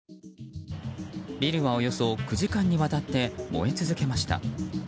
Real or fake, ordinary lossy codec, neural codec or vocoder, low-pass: real; none; none; none